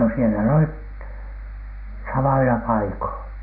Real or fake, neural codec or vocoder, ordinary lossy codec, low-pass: real; none; none; 5.4 kHz